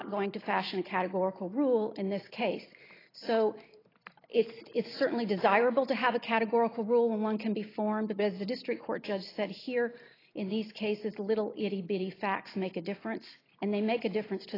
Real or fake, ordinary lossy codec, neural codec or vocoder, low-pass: real; AAC, 24 kbps; none; 5.4 kHz